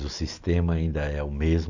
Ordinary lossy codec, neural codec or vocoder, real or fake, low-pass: none; none; real; 7.2 kHz